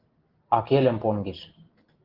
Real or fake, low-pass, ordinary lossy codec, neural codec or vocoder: real; 5.4 kHz; Opus, 16 kbps; none